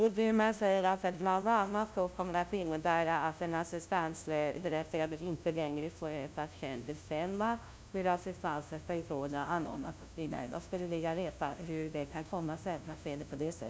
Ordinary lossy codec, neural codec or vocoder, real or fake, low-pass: none; codec, 16 kHz, 0.5 kbps, FunCodec, trained on LibriTTS, 25 frames a second; fake; none